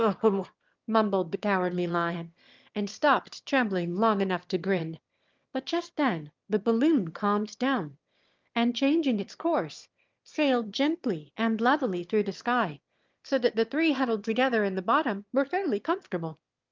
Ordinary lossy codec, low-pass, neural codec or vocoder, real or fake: Opus, 32 kbps; 7.2 kHz; autoencoder, 22.05 kHz, a latent of 192 numbers a frame, VITS, trained on one speaker; fake